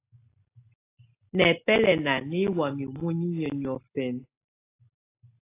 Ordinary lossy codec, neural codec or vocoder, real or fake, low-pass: AAC, 24 kbps; none; real; 3.6 kHz